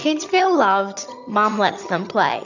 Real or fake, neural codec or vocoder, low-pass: fake; vocoder, 22.05 kHz, 80 mel bands, HiFi-GAN; 7.2 kHz